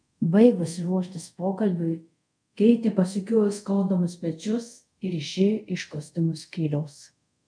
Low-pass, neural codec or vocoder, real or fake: 9.9 kHz; codec, 24 kHz, 0.5 kbps, DualCodec; fake